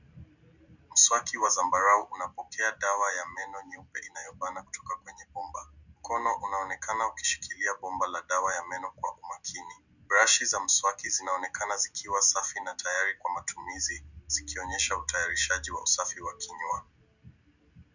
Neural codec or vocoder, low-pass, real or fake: none; 7.2 kHz; real